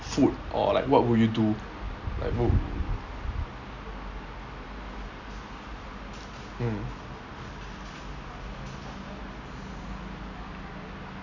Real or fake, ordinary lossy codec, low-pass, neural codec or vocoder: real; none; 7.2 kHz; none